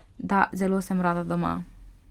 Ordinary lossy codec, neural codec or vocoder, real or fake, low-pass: Opus, 24 kbps; none; real; 19.8 kHz